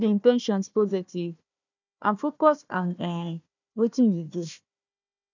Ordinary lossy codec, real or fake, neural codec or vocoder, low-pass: none; fake; codec, 16 kHz, 1 kbps, FunCodec, trained on Chinese and English, 50 frames a second; 7.2 kHz